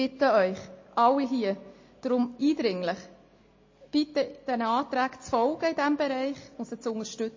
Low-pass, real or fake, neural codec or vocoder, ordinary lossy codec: 7.2 kHz; real; none; MP3, 32 kbps